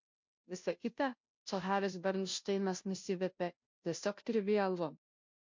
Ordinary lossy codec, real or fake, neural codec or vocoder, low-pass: MP3, 48 kbps; fake; codec, 16 kHz, 0.5 kbps, FunCodec, trained on Chinese and English, 25 frames a second; 7.2 kHz